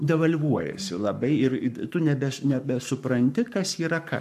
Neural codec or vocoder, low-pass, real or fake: codec, 44.1 kHz, 7.8 kbps, Pupu-Codec; 14.4 kHz; fake